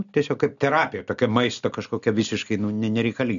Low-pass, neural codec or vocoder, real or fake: 7.2 kHz; none; real